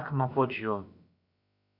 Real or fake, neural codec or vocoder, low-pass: fake; codec, 16 kHz, about 1 kbps, DyCAST, with the encoder's durations; 5.4 kHz